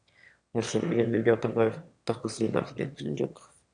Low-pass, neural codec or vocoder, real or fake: 9.9 kHz; autoencoder, 22.05 kHz, a latent of 192 numbers a frame, VITS, trained on one speaker; fake